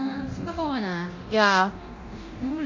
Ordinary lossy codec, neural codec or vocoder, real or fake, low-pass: MP3, 48 kbps; codec, 24 kHz, 0.9 kbps, DualCodec; fake; 7.2 kHz